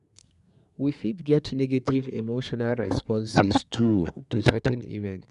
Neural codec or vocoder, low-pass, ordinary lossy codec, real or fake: codec, 24 kHz, 1 kbps, SNAC; 10.8 kHz; none; fake